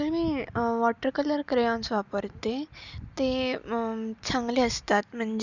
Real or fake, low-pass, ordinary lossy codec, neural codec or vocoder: real; 7.2 kHz; none; none